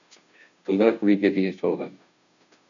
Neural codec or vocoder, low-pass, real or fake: codec, 16 kHz, 0.5 kbps, FunCodec, trained on Chinese and English, 25 frames a second; 7.2 kHz; fake